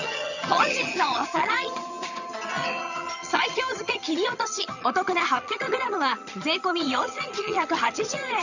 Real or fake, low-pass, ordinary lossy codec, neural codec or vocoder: fake; 7.2 kHz; none; vocoder, 22.05 kHz, 80 mel bands, HiFi-GAN